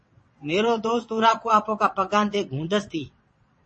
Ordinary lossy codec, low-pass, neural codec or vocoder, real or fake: MP3, 32 kbps; 9.9 kHz; vocoder, 22.05 kHz, 80 mel bands, WaveNeXt; fake